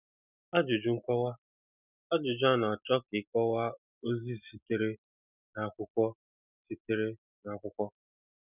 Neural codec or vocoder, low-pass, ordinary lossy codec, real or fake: none; 3.6 kHz; none; real